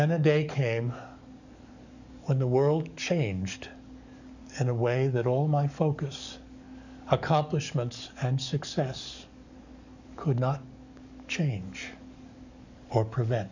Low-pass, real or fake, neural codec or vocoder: 7.2 kHz; fake; codec, 44.1 kHz, 7.8 kbps, DAC